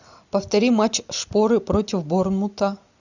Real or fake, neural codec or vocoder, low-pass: real; none; 7.2 kHz